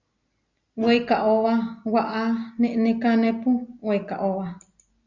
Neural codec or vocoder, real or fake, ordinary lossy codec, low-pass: none; real; Opus, 64 kbps; 7.2 kHz